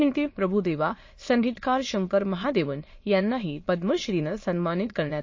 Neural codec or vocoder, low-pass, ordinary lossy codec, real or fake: autoencoder, 22.05 kHz, a latent of 192 numbers a frame, VITS, trained on many speakers; 7.2 kHz; MP3, 32 kbps; fake